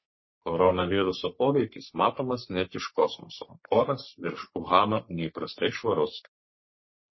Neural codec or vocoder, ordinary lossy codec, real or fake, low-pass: codec, 44.1 kHz, 3.4 kbps, Pupu-Codec; MP3, 24 kbps; fake; 7.2 kHz